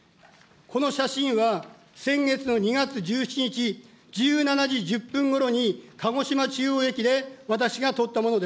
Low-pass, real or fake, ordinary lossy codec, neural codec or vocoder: none; real; none; none